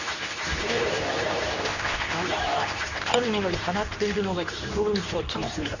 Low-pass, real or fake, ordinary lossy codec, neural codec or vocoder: 7.2 kHz; fake; none; codec, 24 kHz, 0.9 kbps, WavTokenizer, medium speech release version 2